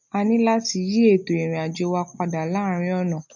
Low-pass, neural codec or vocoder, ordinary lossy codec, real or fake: 7.2 kHz; none; none; real